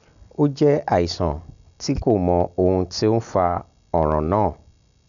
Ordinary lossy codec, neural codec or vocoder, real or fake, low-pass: none; none; real; 7.2 kHz